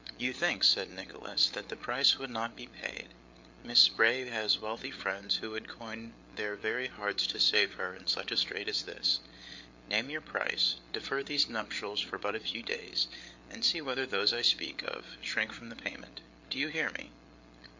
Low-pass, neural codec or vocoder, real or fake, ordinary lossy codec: 7.2 kHz; codec, 16 kHz, 8 kbps, FreqCodec, larger model; fake; MP3, 48 kbps